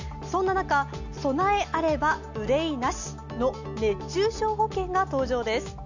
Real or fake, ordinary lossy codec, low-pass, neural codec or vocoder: real; none; 7.2 kHz; none